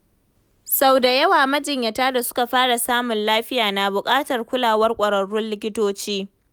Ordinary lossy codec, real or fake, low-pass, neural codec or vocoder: none; real; none; none